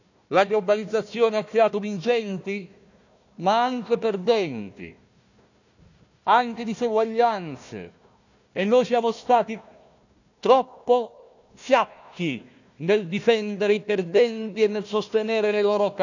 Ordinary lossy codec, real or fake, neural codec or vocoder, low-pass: none; fake; codec, 16 kHz, 1 kbps, FunCodec, trained on Chinese and English, 50 frames a second; 7.2 kHz